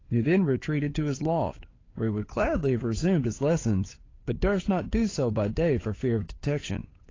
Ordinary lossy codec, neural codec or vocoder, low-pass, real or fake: AAC, 32 kbps; codec, 16 kHz, 8 kbps, FunCodec, trained on Chinese and English, 25 frames a second; 7.2 kHz; fake